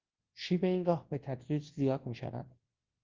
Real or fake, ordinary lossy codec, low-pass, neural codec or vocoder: fake; Opus, 16 kbps; 7.2 kHz; codec, 24 kHz, 0.9 kbps, WavTokenizer, large speech release